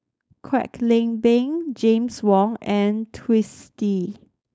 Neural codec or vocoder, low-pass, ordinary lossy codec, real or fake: codec, 16 kHz, 4.8 kbps, FACodec; none; none; fake